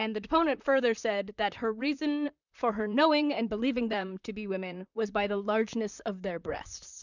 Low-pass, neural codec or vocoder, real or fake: 7.2 kHz; vocoder, 44.1 kHz, 128 mel bands, Pupu-Vocoder; fake